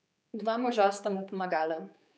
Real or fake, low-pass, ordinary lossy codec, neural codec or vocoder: fake; none; none; codec, 16 kHz, 4 kbps, X-Codec, HuBERT features, trained on general audio